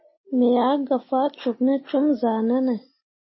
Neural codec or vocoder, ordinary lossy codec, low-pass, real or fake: none; MP3, 24 kbps; 7.2 kHz; real